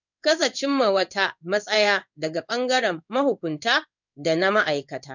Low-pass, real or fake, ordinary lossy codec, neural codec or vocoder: 7.2 kHz; fake; none; codec, 16 kHz in and 24 kHz out, 1 kbps, XY-Tokenizer